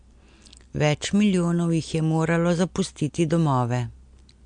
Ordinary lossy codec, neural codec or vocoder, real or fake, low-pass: MP3, 64 kbps; none; real; 9.9 kHz